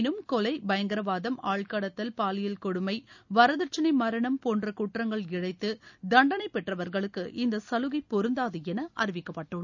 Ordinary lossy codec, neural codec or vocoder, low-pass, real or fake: none; none; 7.2 kHz; real